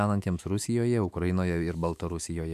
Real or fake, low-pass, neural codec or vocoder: fake; 14.4 kHz; autoencoder, 48 kHz, 128 numbers a frame, DAC-VAE, trained on Japanese speech